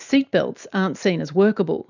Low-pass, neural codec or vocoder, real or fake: 7.2 kHz; none; real